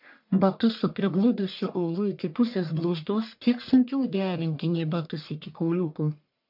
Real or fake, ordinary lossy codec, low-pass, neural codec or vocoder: fake; MP3, 48 kbps; 5.4 kHz; codec, 44.1 kHz, 1.7 kbps, Pupu-Codec